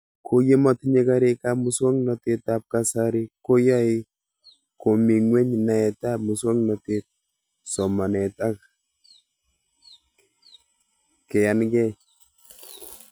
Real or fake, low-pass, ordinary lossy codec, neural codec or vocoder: real; none; none; none